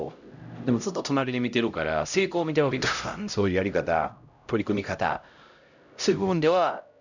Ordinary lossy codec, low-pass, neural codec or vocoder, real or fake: none; 7.2 kHz; codec, 16 kHz, 0.5 kbps, X-Codec, HuBERT features, trained on LibriSpeech; fake